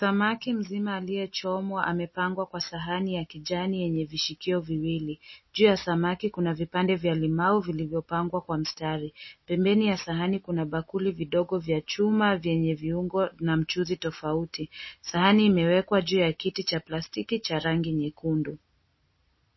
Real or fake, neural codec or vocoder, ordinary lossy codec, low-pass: real; none; MP3, 24 kbps; 7.2 kHz